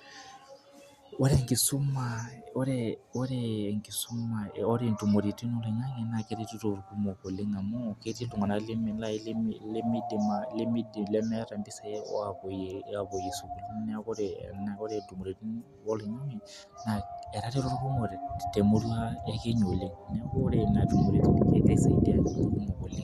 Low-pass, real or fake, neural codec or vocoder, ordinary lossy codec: 14.4 kHz; real; none; Opus, 64 kbps